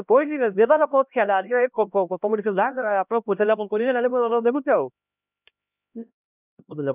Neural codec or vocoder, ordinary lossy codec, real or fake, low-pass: codec, 16 kHz, 1 kbps, X-Codec, HuBERT features, trained on LibriSpeech; none; fake; 3.6 kHz